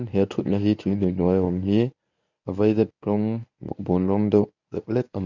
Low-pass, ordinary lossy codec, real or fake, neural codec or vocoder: 7.2 kHz; none; fake; codec, 24 kHz, 0.9 kbps, WavTokenizer, medium speech release version 1